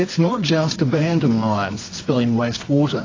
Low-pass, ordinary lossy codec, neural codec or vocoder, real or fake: 7.2 kHz; AAC, 32 kbps; codec, 24 kHz, 0.9 kbps, WavTokenizer, medium music audio release; fake